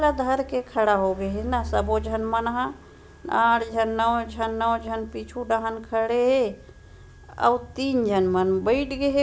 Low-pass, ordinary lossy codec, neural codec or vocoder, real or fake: none; none; none; real